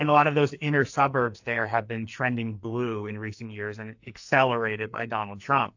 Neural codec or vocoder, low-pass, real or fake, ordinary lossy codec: codec, 44.1 kHz, 2.6 kbps, SNAC; 7.2 kHz; fake; AAC, 48 kbps